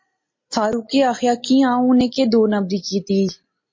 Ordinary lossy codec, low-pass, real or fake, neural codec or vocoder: MP3, 32 kbps; 7.2 kHz; real; none